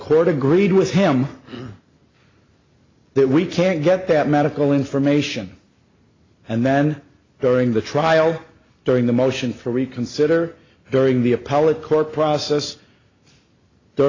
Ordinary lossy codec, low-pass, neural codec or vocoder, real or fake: AAC, 32 kbps; 7.2 kHz; none; real